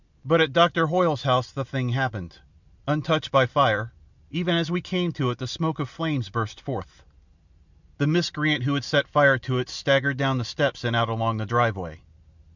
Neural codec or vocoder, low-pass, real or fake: none; 7.2 kHz; real